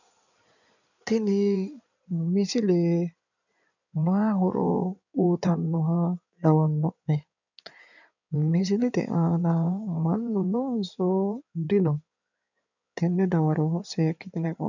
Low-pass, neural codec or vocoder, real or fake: 7.2 kHz; codec, 16 kHz in and 24 kHz out, 2.2 kbps, FireRedTTS-2 codec; fake